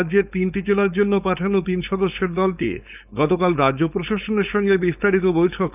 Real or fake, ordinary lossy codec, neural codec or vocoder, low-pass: fake; none; codec, 16 kHz, 4.8 kbps, FACodec; 3.6 kHz